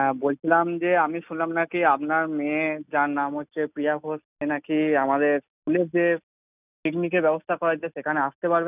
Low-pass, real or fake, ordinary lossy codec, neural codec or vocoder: 3.6 kHz; real; none; none